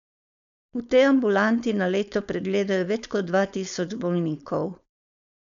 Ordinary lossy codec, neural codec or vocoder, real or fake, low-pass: none; codec, 16 kHz, 4.8 kbps, FACodec; fake; 7.2 kHz